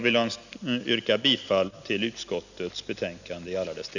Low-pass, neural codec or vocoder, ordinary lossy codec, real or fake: 7.2 kHz; none; none; real